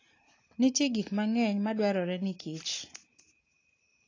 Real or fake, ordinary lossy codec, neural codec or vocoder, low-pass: real; AAC, 32 kbps; none; 7.2 kHz